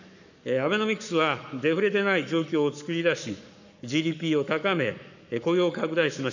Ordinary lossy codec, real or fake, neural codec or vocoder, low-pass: none; fake; codec, 44.1 kHz, 7.8 kbps, Pupu-Codec; 7.2 kHz